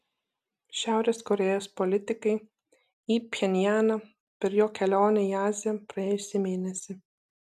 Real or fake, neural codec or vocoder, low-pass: real; none; 14.4 kHz